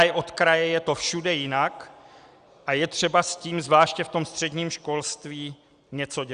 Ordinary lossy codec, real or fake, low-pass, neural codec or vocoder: Opus, 64 kbps; real; 9.9 kHz; none